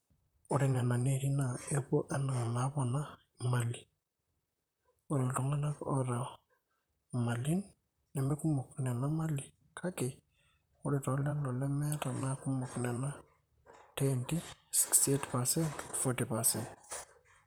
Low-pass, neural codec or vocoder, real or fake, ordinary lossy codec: none; vocoder, 44.1 kHz, 128 mel bands, Pupu-Vocoder; fake; none